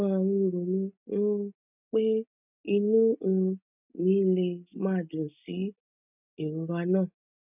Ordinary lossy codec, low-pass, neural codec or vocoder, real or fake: none; 3.6 kHz; none; real